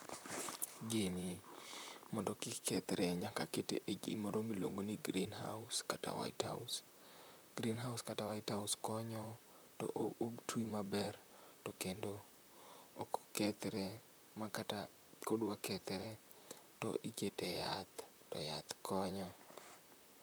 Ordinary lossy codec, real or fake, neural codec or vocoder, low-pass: none; fake; vocoder, 44.1 kHz, 128 mel bands, Pupu-Vocoder; none